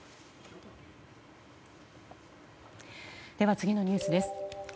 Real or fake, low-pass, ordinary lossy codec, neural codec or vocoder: real; none; none; none